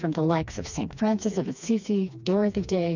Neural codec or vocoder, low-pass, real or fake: codec, 16 kHz, 2 kbps, FreqCodec, smaller model; 7.2 kHz; fake